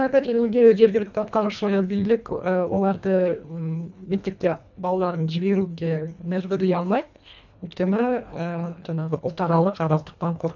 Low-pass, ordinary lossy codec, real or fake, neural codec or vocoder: 7.2 kHz; none; fake; codec, 24 kHz, 1.5 kbps, HILCodec